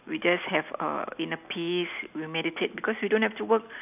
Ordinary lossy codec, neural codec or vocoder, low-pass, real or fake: none; none; 3.6 kHz; real